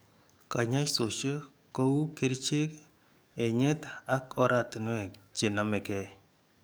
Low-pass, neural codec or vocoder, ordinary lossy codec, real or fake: none; codec, 44.1 kHz, 7.8 kbps, DAC; none; fake